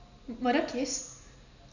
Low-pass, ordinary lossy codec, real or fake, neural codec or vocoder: 7.2 kHz; none; real; none